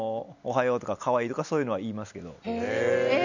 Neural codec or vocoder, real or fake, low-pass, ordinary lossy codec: none; real; 7.2 kHz; none